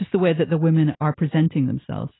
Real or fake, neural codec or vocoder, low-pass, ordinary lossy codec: real; none; 7.2 kHz; AAC, 16 kbps